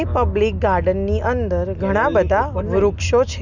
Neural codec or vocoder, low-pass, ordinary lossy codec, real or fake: none; 7.2 kHz; none; real